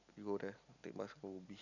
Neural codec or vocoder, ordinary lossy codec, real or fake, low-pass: none; none; real; 7.2 kHz